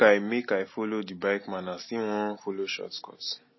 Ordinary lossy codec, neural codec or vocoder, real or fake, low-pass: MP3, 24 kbps; none; real; 7.2 kHz